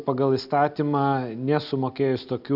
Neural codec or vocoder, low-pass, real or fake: none; 5.4 kHz; real